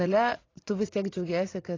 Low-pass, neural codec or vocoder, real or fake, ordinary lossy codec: 7.2 kHz; vocoder, 44.1 kHz, 80 mel bands, Vocos; fake; AAC, 32 kbps